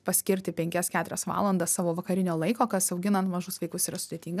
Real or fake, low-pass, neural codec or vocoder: real; 14.4 kHz; none